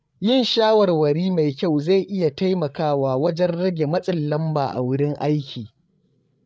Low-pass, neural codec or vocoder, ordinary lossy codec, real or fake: none; codec, 16 kHz, 8 kbps, FreqCodec, larger model; none; fake